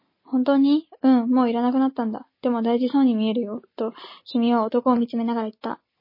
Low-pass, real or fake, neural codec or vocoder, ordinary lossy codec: 5.4 kHz; real; none; MP3, 24 kbps